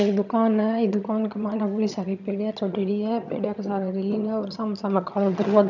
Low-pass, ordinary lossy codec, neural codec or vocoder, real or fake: 7.2 kHz; none; vocoder, 22.05 kHz, 80 mel bands, HiFi-GAN; fake